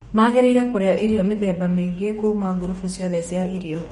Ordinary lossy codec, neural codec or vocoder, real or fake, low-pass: MP3, 48 kbps; codec, 44.1 kHz, 2.6 kbps, DAC; fake; 19.8 kHz